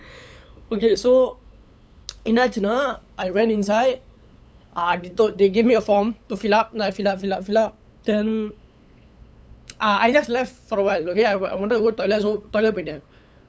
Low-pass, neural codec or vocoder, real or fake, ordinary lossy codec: none; codec, 16 kHz, 8 kbps, FunCodec, trained on LibriTTS, 25 frames a second; fake; none